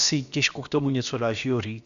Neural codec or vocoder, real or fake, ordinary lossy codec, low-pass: codec, 16 kHz, about 1 kbps, DyCAST, with the encoder's durations; fake; Opus, 64 kbps; 7.2 kHz